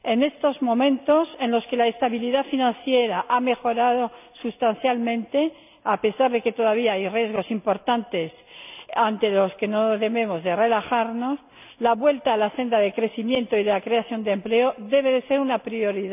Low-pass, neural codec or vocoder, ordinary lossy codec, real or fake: 3.6 kHz; none; none; real